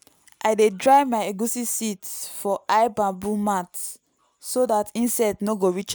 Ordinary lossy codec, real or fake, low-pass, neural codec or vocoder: none; real; none; none